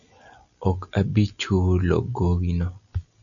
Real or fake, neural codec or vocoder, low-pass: real; none; 7.2 kHz